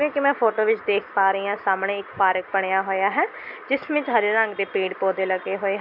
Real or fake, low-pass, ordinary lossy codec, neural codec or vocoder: real; 5.4 kHz; none; none